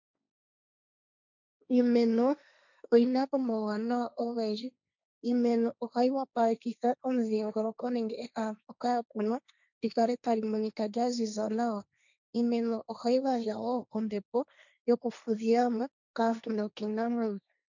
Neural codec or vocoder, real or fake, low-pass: codec, 16 kHz, 1.1 kbps, Voila-Tokenizer; fake; 7.2 kHz